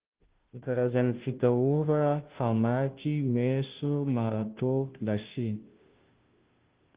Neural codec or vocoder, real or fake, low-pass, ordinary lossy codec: codec, 16 kHz, 0.5 kbps, FunCodec, trained on Chinese and English, 25 frames a second; fake; 3.6 kHz; Opus, 16 kbps